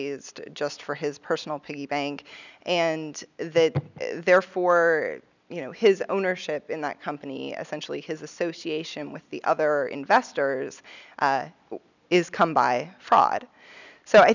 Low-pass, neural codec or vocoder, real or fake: 7.2 kHz; none; real